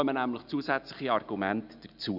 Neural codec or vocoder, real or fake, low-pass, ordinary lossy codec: none; real; 5.4 kHz; none